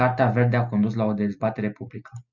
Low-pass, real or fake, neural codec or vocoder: 7.2 kHz; real; none